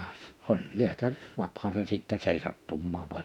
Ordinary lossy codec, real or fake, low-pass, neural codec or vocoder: none; fake; 19.8 kHz; autoencoder, 48 kHz, 32 numbers a frame, DAC-VAE, trained on Japanese speech